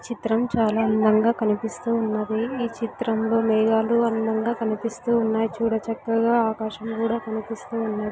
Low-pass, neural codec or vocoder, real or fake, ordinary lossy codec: none; none; real; none